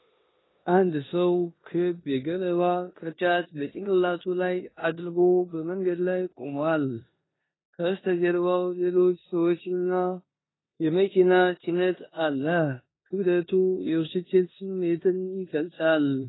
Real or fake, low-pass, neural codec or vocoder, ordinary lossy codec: fake; 7.2 kHz; codec, 16 kHz in and 24 kHz out, 0.9 kbps, LongCat-Audio-Codec, four codebook decoder; AAC, 16 kbps